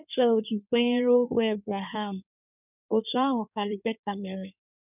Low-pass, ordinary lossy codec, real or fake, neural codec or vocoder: 3.6 kHz; none; fake; codec, 16 kHz in and 24 kHz out, 1.1 kbps, FireRedTTS-2 codec